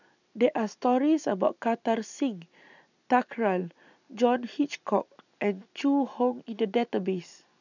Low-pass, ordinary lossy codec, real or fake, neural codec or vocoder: 7.2 kHz; none; real; none